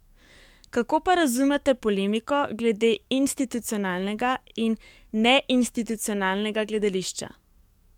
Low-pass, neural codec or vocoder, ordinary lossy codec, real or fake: 19.8 kHz; codec, 44.1 kHz, 7.8 kbps, DAC; MP3, 96 kbps; fake